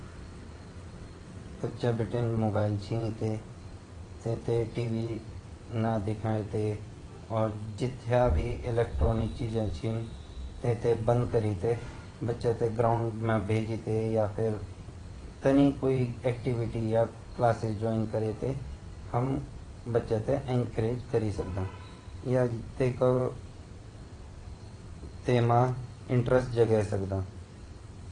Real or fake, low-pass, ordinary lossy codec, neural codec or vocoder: fake; 9.9 kHz; AAC, 32 kbps; vocoder, 22.05 kHz, 80 mel bands, WaveNeXt